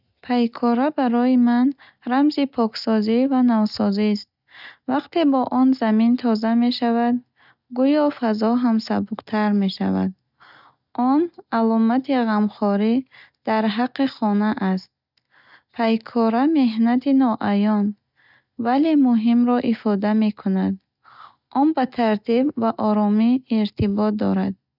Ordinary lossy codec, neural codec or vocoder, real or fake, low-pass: none; none; real; 5.4 kHz